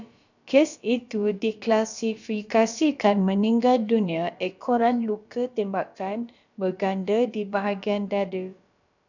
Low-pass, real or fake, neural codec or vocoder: 7.2 kHz; fake; codec, 16 kHz, about 1 kbps, DyCAST, with the encoder's durations